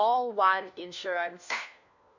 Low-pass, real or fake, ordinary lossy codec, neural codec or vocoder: 7.2 kHz; fake; none; codec, 16 kHz, 1 kbps, FunCodec, trained on LibriTTS, 50 frames a second